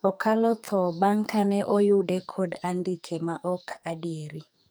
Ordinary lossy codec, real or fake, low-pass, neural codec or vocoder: none; fake; none; codec, 44.1 kHz, 2.6 kbps, SNAC